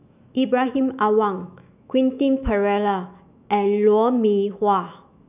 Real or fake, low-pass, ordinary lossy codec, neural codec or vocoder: fake; 3.6 kHz; none; autoencoder, 48 kHz, 128 numbers a frame, DAC-VAE, trained on Japanese speech